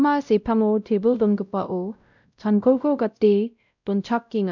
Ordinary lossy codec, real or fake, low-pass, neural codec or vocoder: none; fake; 7.2 kHz; codec, 16 kHz, 0.5 kbps, X-Codec, WavLM features, trained on Multilingual LibriSpeech